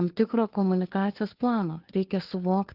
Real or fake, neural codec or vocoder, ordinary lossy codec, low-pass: fake; codec, 16 kHz, 2 kbps, FunCodec, trained on Chinese and English, 25 frames a second; Opus, 16 kbps; 5.4 kHz